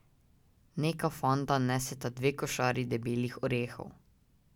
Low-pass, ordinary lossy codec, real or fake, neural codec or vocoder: 19.8 kHz; none; real; none